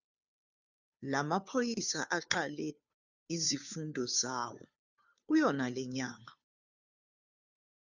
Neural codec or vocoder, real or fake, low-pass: codec, 16 kHz, 2 kbps, FunCodec, trained on Chinese and English, 25 frames a second; fake; 7.2 kHz